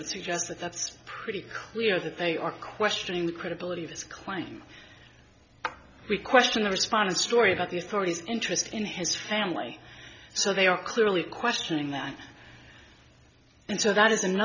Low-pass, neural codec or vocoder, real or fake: 7.2 kHz; none; real